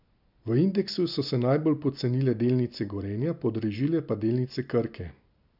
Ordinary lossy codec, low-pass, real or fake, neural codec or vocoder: none; 5.4 kHz; real; none